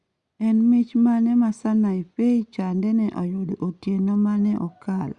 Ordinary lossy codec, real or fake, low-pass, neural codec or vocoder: Opus, 64 kbps; real; 10.8 kHz; none